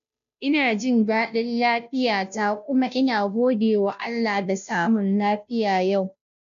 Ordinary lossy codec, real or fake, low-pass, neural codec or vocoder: none; fake; 7.2 kHz; codec, 16 kHz, 0.5 kbps, FunCodec, trained on Chinese and English, 25 frames a second